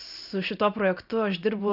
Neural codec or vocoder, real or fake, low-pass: vocoder, 44.1 kHz, 128 mel bands every 512 samples, BigVGAN v2; fake; 5.4 kHz